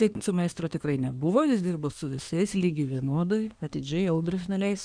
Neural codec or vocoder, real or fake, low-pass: codec, 24 kHz, 1 kbps, SNAC; fake; 9.9 kHz